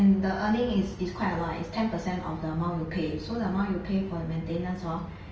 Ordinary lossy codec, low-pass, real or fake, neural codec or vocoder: Opus, 24 kbps; 7.2 kHz; real; none